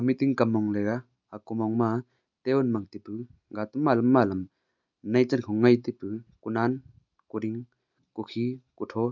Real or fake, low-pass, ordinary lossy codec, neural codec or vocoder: real; 7.2 kHz; none; none